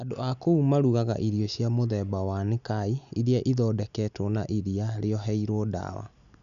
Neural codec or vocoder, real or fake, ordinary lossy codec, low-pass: none; real; MP3, 96 kbps; 7.2 kHz